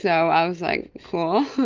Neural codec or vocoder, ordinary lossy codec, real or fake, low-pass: none; Opus, 32 kbps; real; 7.2 kHz